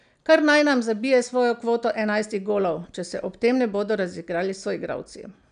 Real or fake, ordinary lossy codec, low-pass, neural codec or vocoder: real; none; 9.9 kHz; none